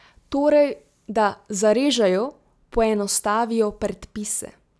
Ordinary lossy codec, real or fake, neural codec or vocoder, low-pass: none; real; none; none